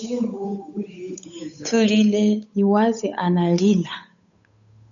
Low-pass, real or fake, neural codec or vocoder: 7.2 kHz; fake; codec, 16 kHz, 8 kbps, FunCodec, trained on Chinese and English, 25 frames a second